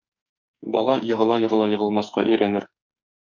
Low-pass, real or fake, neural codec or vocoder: 7.2 kHz; fake; codec, 44.1 kHz, 2.6 kbps, SNAC